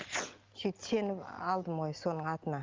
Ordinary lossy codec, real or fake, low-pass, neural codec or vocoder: Opus, 32 kbps; real; 7.2 kHz; none